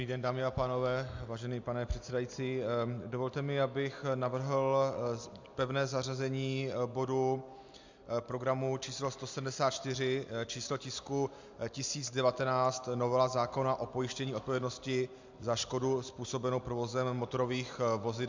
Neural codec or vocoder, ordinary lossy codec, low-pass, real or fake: none; MP3, 64 kbps; 7.2 kHz; real